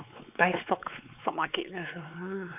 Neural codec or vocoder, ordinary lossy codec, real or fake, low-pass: codec, 16 kHz, 4 kbps, X-Codec, WavLM features, trained on Multilingual LibriSpeech; none; fake; 3.6 kHz